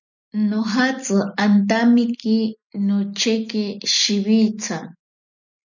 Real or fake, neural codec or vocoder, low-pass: real; none; 7.2 kHz